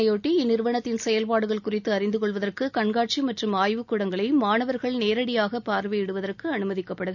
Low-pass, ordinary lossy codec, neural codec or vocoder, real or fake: 7.2 kHz; none; none; real